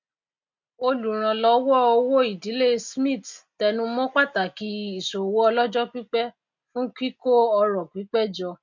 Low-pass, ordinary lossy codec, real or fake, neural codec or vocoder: 7.2 kHz; MP3, 48 kbps; real; none